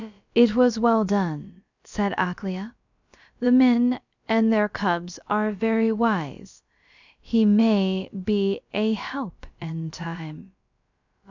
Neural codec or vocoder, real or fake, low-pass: codec, 16 kHz, about 1 kbps, DyCAST, with the encoder's durations; fake; 7.2 kHz